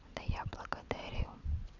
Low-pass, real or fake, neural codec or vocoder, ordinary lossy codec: 7.2 kHz; fake; vocoder, 22.05 kHz, 80 mel bands, WaveNeXt; none